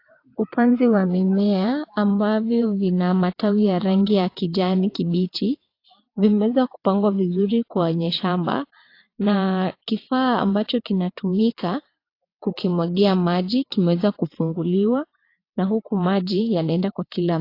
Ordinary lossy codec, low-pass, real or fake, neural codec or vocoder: AAC, 32 kbps; 5.4 kHz; fake; vocoder, 22.05 kHz, 80 mel bands, WaveNeXt